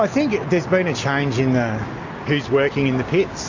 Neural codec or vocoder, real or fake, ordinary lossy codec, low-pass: none; real; AAC, 48 kbps; 7.2 kHz